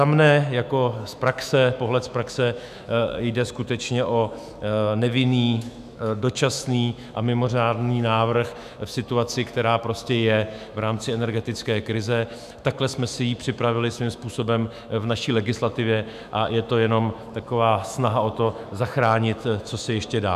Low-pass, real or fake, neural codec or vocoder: 14.4 kHz; fake; autoencoder, 48 kHz, 128 numbers a frame, DAC-VAE, trained on Japanese speech